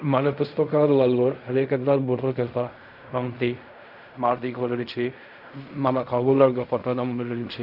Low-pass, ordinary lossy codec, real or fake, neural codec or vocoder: 5.4 kHz; none; fake; codec, 16 kHz in and 24 kHz out, 0.4 kbps, LongCat-Audio-Codec, fine tuned four codebook decoder